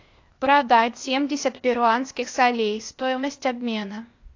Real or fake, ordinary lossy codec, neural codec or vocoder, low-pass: fake; AAC, 48 kbps; codec, 16 kHz, 0.8 kbps, ZipCodec; 7.2 kHz